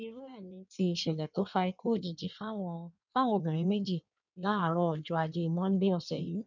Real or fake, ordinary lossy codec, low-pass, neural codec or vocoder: fake; none; 7.2 kHz; codec, 16 kHz in and 24 kHz out, 1.1 kbps, FireRedTTS-2 codec